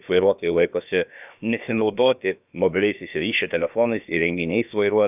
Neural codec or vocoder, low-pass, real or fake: codec, 16 kHz, about 1 kbps, DyCAST, with the encoder's durations; 3.6 kHz; fake